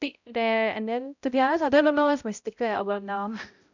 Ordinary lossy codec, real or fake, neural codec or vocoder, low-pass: none; fake; codec, 16 kHz, 0.5 kbps, X-Codec, HuBERT features, trained on balanced general audio; 7.2 kHz